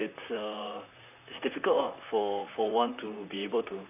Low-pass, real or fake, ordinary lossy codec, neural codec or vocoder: 3.6 kHz; fake; none; vocoder, 44.1 kHz, 128 mel bands, Pupu-Vocoder